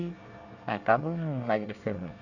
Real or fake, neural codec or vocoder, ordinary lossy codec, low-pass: fake; codec, 24 kHz, 1 kbps, SNAC; none; 7.2 kHz